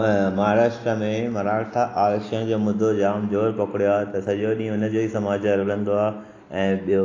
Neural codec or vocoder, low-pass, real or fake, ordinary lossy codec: none; 7.2 kHz; real; AAC, 32 kbps